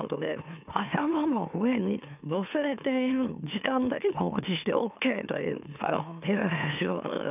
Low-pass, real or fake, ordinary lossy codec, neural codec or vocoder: 3.6 kHz; fake; none; autoencoder, 44.1 kHz, a latent of 192 numbers a frame, MeloTTS